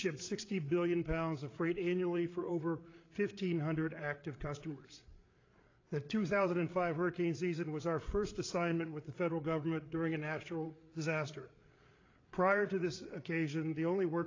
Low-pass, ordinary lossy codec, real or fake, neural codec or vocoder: 7.2 kHz; AAC, 32 kbps; fake; codec, 16 kHz, 8 kbps, FreqCodec, larger model